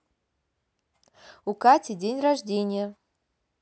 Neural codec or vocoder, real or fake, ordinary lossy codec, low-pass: none; real; none; none